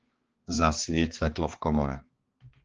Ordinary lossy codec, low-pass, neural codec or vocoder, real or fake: Opus, 16 kbps; 7.2 kHz; codec, 16 kHz, 2 kbps, X-Codec, HuBERT features, trained on balanced general audio; fake